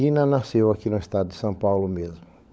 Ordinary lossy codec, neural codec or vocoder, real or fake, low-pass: none; codec, 16 kHz, 16 kbps, FunCodec, trained on LibriTTS, 50 frames a second; fake; none